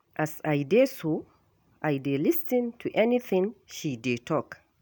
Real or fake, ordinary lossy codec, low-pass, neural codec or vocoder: real; none; none; none